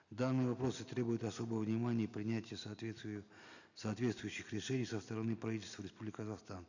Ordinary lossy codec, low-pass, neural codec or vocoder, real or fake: none; 7.2 kHz; none; real